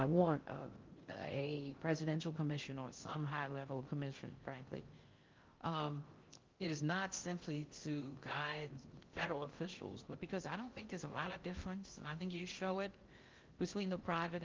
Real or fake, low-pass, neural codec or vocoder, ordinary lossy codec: fake; 7.2 kHz; codec, 16 kHz in and 24 kHz out, 0.6 kbps, FocalCodec, streaming, 4096 codes; Opus, 24 kbps